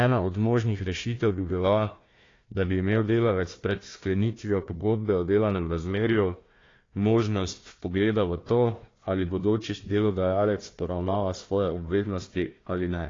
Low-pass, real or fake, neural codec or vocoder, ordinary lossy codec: 7.2 kHz; fake; codec, 16 kHz, 1 kbps, FunCodec, trained on Chinese and English, 50 frames a second; AAC, 32 kbps